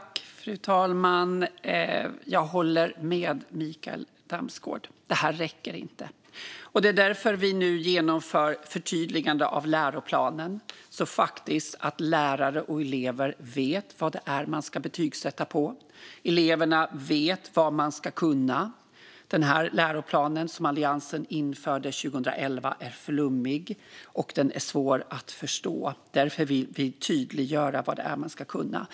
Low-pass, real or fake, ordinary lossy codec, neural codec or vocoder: none; real; none; none